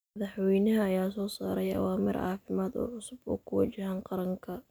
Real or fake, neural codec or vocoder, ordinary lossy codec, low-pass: real; none; none; none